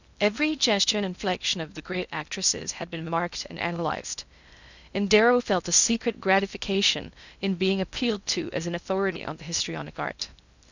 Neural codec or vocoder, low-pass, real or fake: codec, 16 kHz in and 24 kHz out, 0.6 kbps, FocalCodec, streaming, 2048 codes; 7.2 kHz; fake